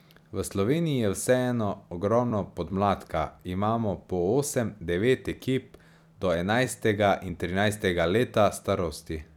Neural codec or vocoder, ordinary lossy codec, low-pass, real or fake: vocoder, 44.1 kHz, 128 mel bands every 256 samples, BigVGAN v2; none; 19.8 kHz; fake